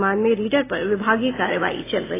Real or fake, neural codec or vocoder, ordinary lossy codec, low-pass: real; none; AAC, 16 kbps; 3.6 kHz